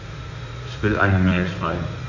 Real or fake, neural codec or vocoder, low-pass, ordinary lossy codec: fake; codec, 16 kHz in and 24 kHz out, 2.2 kbps, FireRedTTS-2 codec; 7.2 kHz; AAC, 48 kbps